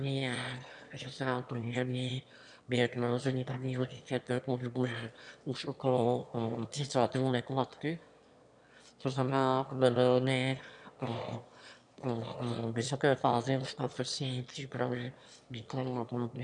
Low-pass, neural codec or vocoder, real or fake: 9.9 kHz; autoencoder, 22.05 kHz, a latent of 192 numbers a frame, VITS, trained on one speaker; fake